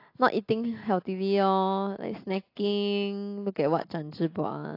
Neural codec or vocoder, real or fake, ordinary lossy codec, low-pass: none; real; AAC, 32 kbps; 5.4 kHz